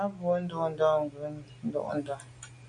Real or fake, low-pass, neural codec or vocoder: real; 9.9 kHz; none